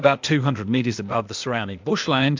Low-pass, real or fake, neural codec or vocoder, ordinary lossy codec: 7.2 kHz; fake; codec, 16 kHz, 0.8 kbps, ZipCodec; MP3, 64 kbps